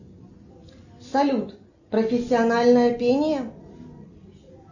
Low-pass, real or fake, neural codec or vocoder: 7.2 kHz; real; none